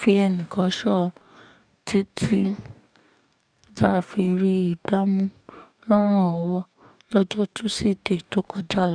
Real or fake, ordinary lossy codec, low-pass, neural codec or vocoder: fake; none; 9.9 kHz; codec, 44.1 kHz, 2.6 kbps, DAC